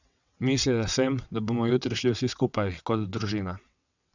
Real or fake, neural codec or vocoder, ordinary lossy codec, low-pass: fake; vocoder, 22.05 kHz, 80 mel bands, WaveNeXt; none; 7.2 kHz